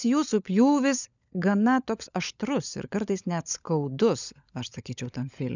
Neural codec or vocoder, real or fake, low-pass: codec, 16 kHz, 16 kbps, FunCodec, trained on LibriTTS, 50 frames a second; fake; 7.2 kHz